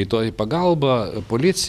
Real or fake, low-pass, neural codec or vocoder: real; 14.4 kHz; none